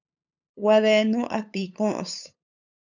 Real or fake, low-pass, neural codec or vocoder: fake; 7.2 kHz; codec, 16 kHz, 8 kbps, FunCodec, trained on LibriTTS, 25 frames a second